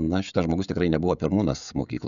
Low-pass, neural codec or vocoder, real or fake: 7.2 kHz; codec, 16 kHz, 16 kbps, FreqCodec, smaller model; fake